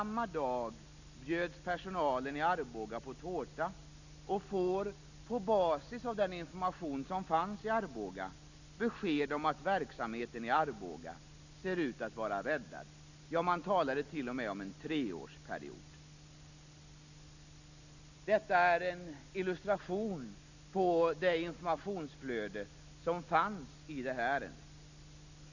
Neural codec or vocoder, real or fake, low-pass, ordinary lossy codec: none; real; 7.2 kHz; none